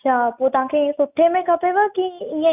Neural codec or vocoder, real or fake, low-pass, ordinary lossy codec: none; real; 3.6 kHz; none